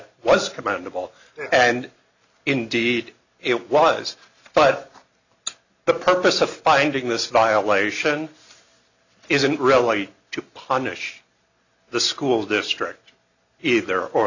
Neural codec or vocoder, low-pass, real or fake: none; 7.2 kHz; real